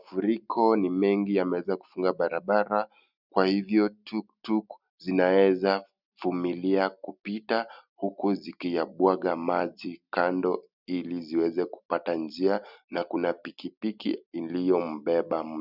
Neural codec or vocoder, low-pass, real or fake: none; 5.4 kHz; real